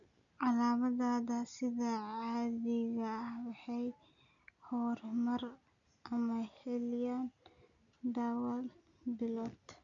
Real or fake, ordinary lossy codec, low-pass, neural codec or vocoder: real; none; 7.2 kHz; none